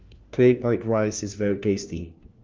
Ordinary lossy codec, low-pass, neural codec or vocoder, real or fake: Opus, 24 kbps; 7.2 kHz; codec, 16 kHz, 1 kbps, FunCodec, trained on LibriTTS, 50 frames a second; fake